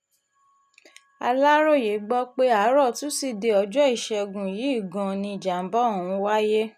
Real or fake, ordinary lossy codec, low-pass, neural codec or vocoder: real; none; 14.4 kHz; none